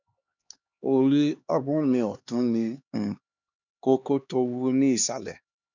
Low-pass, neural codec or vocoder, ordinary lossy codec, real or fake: 7.2 kHz; codec, 16 kHz, 2 kbps, X-Codec, HuBERT features, trained on LibriSpeech; none; fake